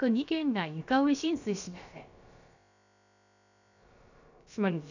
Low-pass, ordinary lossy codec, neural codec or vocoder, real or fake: 7.2 kHz; none; codec, 16 kHz, about 1 kbps, DyCAST, with the encoder's durations; fake